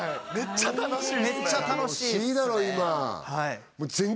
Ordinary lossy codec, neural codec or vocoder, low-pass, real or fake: none; none; none; real